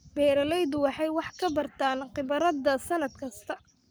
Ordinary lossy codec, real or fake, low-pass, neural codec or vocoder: none; fake; none; codec, 44.1 kHz, 7.8 kbps, Pupu-Codec